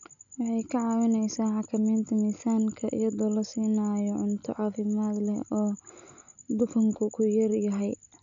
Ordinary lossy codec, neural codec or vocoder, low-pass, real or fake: none; none; 7.2 kHz; real